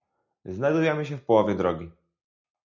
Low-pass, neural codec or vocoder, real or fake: 7.2 kHz; none; real